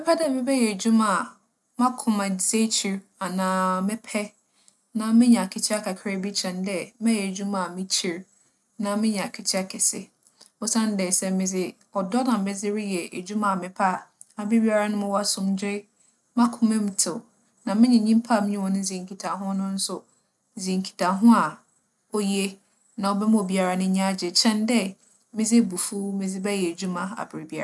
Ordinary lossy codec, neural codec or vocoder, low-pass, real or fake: none; none; none; real